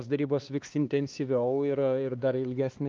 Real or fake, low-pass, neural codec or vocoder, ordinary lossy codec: fake; 7.2 kHz; codec, 16 kHz, 2 kbps, X-Codec, HuBERT features, trained on LibriSpeech; Opus, 24 kbps